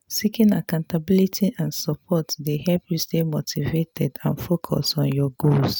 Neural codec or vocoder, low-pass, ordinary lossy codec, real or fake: none; none; none; real